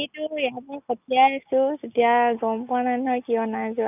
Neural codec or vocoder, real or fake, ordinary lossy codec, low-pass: none; real; none; 3.6 kHz